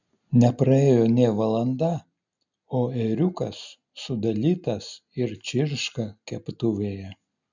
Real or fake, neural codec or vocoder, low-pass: real; none; 7.2 kHz